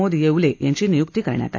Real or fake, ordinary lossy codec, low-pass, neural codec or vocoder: real; AAC, 48 kbps; 7.2 kHz; none